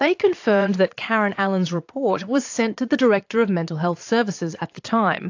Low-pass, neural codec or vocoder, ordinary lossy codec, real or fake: 7.2 kHz; vocoder, 22.05 kHz, 80 mel bands, Vocos; AAC, 48 kbps; fake